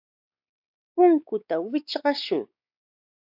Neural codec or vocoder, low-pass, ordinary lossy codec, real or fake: codec, 16 kHz, 4 kbps, X-Codec, WavLM features, trained on Multilingual LibriSpeech; 5.4 kHz; AAC, 48 kbps; fake